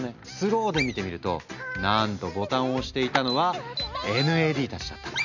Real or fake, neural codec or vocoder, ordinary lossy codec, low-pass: real; none; none; 7.2 kHz